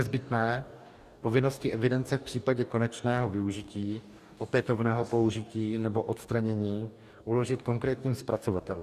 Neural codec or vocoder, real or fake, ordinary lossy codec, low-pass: codec, 44.1 kHz, 2.6 kbps, DAC; fake; Opus, 64 kbps; 14.4 kHz